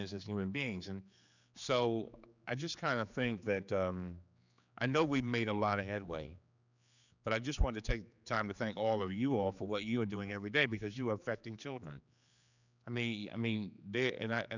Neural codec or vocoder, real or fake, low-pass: codec, 16 kHz, 4 kbps, X-Codec, HuBERT features, trained on general audio; fake; 7.2 kHz